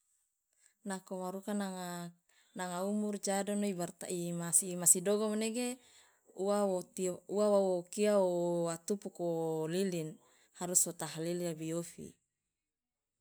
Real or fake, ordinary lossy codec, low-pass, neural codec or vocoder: real; none; none; none